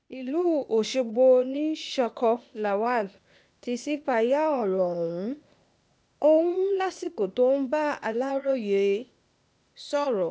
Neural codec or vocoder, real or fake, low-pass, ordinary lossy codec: codec, 16 kHz, 0.8 kbps, ZipCodec; fake; none; none